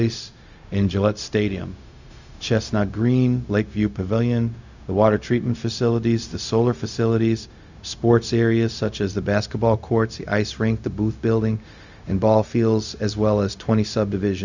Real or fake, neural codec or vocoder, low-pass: fake; codec, 16 kHz, 0.4 kbps, LongCat-Audio-Codec; 7.2 kHz